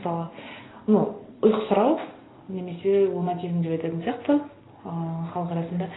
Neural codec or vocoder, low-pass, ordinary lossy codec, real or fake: none; 7.2 kHz; AAC, 16 kbps; real